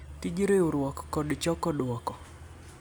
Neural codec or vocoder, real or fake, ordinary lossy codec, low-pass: none; real; none; none